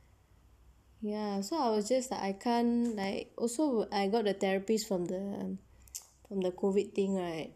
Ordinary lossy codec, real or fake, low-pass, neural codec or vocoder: none; real; 14.4 kHz; none